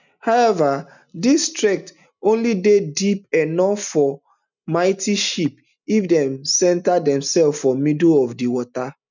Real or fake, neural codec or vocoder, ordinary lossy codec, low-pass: real; none; none; 7.2 kHz